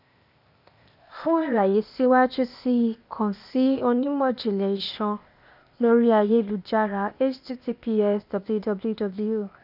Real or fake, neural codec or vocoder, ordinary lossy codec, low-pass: fake; codec, 16 kHz, 0.8 kbps, ZipCodec; none; 5.4 kHz